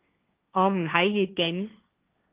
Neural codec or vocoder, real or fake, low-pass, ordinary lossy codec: codec, 16 kHz, 1.1 kbps, Voila-Tokenizer; fake; 3.6 kHz; Opus, 24 kbps